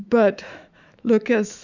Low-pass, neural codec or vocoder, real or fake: 7.2 kHz; autoencoder, 48 kHz, 128 numbers a frame, DAC-VAE, trained on Japanese speech; fake